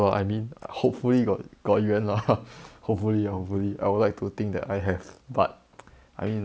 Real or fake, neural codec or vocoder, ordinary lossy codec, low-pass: real; none; none; none